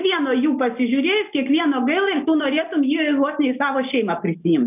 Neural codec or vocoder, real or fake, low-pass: none; real; 3.6 kHz